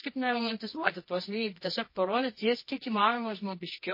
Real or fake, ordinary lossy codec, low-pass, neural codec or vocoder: fake; MP3, 24 kbps; 5.4 kHz; codec, 24 kHz, 0.9 kbps, WavTokenizer, medium music audio release